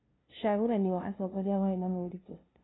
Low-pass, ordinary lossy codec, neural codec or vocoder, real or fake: 7.2 kHz; AAC, 16 kbps; codec, 16 kHz, 0.5 kbps, FunCodec, trained on LibriTTS, 25 frames a second; fake